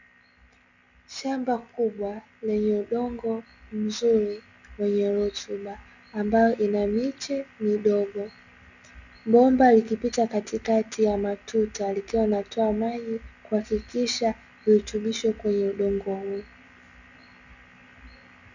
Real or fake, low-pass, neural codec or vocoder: real; 7.2 kHz; none